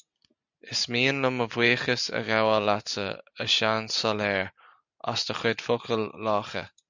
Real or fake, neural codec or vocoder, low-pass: real; none; 7.2 kHz